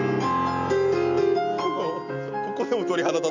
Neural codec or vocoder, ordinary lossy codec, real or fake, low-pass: none; none; real; 7.2 kHz